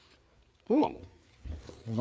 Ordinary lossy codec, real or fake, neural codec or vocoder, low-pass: none; fake; codec, 16 kHz, 4 kbps, FreqCodec, larger model; none